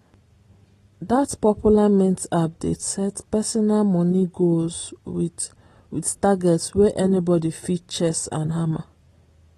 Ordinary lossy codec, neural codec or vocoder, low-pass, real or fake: AAC, 32 kbps; none; 19.8 kHz; real